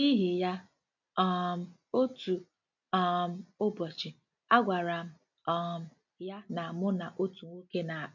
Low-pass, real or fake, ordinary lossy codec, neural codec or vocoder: 7.2 kHz; real; none; none